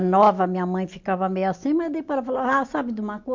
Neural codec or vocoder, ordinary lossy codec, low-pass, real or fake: none; MP3, 48 kbps; 7.2 kHz; real